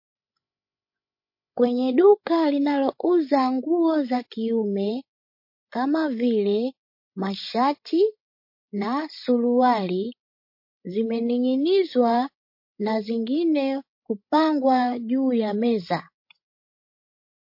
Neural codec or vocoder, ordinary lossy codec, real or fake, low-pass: codec, 16 kHz, 16 kbps, FreqCodec, larger model; MP3, 32 kbps; fake; 5.4 kHz